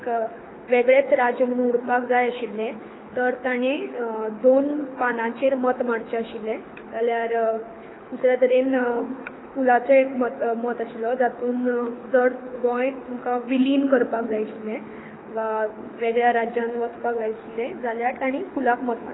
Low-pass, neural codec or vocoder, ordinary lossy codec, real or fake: 7.2 kHz; codec, 24 kHz, 6 kbps, HILCodec; AAC, 16 kbps; fake